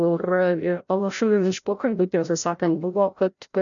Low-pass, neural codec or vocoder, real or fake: 7.2 kHz; codec, 16 kHz, 0.5 kbps, FreqCodec, larger model; fake